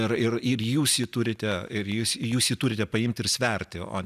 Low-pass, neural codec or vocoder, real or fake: 14.4 kHz; none; real